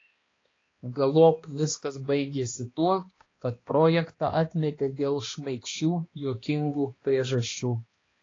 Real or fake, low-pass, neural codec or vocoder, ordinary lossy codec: fake; 7.2 kHz; codec, 16 kHz, 1 kbps, X-Codec, HuBERT features, trained on balanced general audio; AAC, 32 kbps